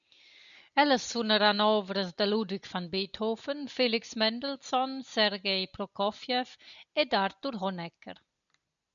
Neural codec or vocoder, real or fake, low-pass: none; real; 7.2 kHz